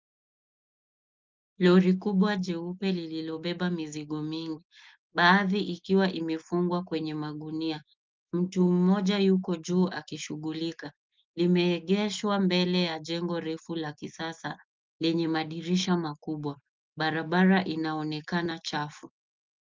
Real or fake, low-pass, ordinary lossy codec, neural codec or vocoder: real; 7.2 kHz; Opus, 24 kbps; none